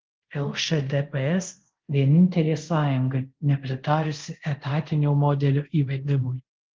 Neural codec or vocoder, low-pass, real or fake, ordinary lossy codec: codec, 24 kHz, 0.5 kbps, DualCodec; 7.2 kHz; fake; Opus, 16 kbps